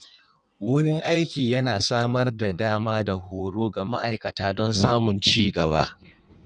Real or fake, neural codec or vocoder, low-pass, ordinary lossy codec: fake; codec, 16 kHz in and 24 kHz out, 1.1 kbps, FireRedTTS-2 codec; 9.9 kHz; none